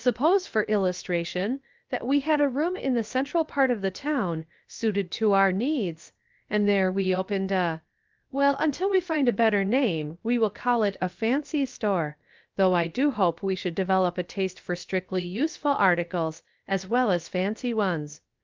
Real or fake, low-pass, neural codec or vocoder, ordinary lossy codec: fake; 7.2 kHz; codec, 16 kHz, about 1 kbps, DyCAST, with the encoder's durations; Opus, 24 kbps